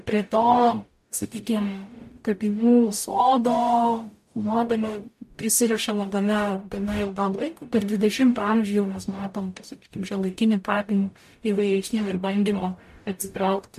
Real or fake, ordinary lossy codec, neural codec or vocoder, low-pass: fake; MP3, 64 kbps; codec, 44.1 kHz, 0.9 kbps, DAC; 14.4 kHz